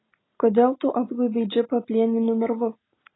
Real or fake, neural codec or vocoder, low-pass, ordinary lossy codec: real; none; 7.2 kHz; AAC, 16 kbps